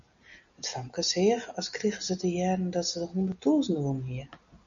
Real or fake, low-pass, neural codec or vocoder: real; 7.2 kHz; none